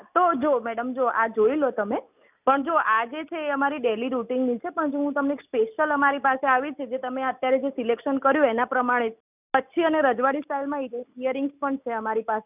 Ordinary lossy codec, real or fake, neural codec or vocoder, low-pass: none; real; none; 3.6 kHz